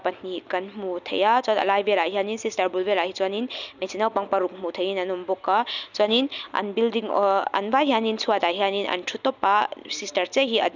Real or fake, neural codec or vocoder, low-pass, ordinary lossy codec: real; none; 7.2 kHz; none